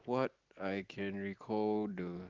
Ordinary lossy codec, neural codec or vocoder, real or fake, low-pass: Opus, 16 kbps; none; real; 7.2 kHz